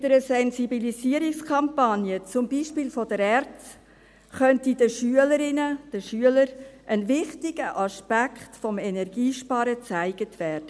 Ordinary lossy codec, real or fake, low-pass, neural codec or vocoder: none; real; none; none